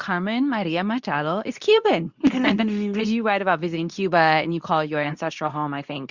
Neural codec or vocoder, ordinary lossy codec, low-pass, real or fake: codec, 24 kHz, 0.9 kbps, WavTokenizer, medium speech release version 2; Opus, 64 kbps; 7.2 kHz; fake